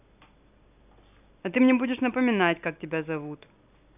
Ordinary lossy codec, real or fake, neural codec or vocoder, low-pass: none; real; none; 3.6 kHz